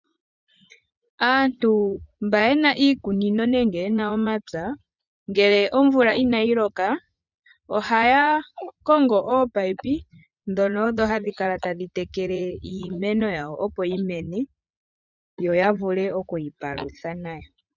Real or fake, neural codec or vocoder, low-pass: fake; vocoder, 44.1 kHz, 80 mel bands, Vocos; 7.2 kHz